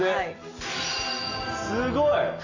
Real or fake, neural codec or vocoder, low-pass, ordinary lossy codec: real; none; 7.2 kHz; Opus, 64 kbps